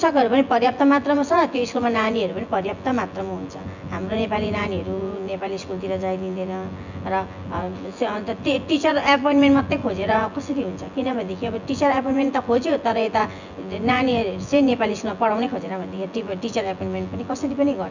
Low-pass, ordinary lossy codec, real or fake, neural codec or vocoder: 7.2 kHz; none; fake; vocoder, 24 kHz, 100 mel bands, Vocos